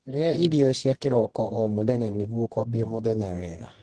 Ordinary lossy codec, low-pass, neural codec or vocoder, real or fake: Opus, 16 kbps; 10.8 kHz; codec, 24 kHz, 0.9 kbps, WavTokenizer, medium music audio release; fake